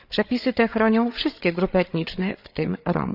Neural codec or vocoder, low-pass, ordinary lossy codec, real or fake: codec, 16 kHz, 8 kbps, FreqCodec, larger model; 5.4 kHz; none; fake